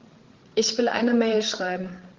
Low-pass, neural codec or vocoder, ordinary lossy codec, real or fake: 7.2 kHz; codec, 16 kHz, 16 kbps, FreqCodec, larger model; Opus, 16 kbps; fake